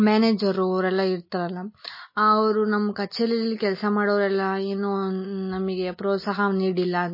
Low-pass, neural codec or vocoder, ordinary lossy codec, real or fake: 5.4 kHz; none; MP3, 24 kbps; real